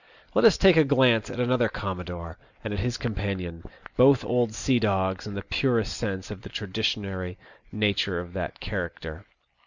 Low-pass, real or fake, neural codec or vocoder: 7.2 kHz; real; none